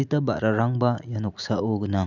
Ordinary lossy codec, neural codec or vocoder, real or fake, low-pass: none; none; real; 7.2 kHz